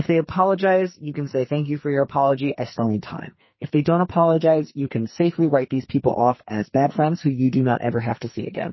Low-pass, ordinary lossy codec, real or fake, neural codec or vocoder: 7.2 kHz; MP3, 24 kbps; fake; codec, 44.1 kHz, 2.6 kbps, SNAC